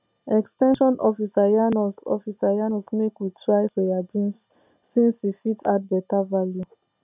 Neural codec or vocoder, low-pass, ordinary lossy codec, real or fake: none; 3.6 kHz; none; real